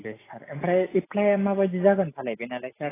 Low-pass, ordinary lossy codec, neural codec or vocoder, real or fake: 3.6 kHz; AAC, 16 kbps; none; real